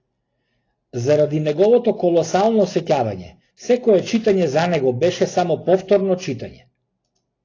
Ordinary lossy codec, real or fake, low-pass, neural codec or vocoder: AAC, 32 kbps; real; 7.2 kHz; none